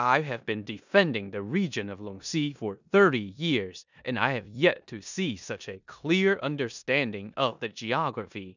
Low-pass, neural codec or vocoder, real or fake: 7.2 kHz; codec, 16 kHz in and 24 kHz out, 0.9 kbps, LongCat-Audio-Codec, four codebook decoder; fake